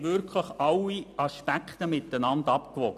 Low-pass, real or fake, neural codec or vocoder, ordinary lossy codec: 14.4 kHz; real; none; none